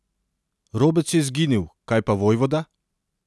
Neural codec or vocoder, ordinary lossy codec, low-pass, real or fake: none; none; none; real